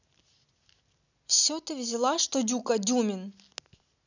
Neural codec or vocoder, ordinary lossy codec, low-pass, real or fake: none; none; 7.2 kHz; real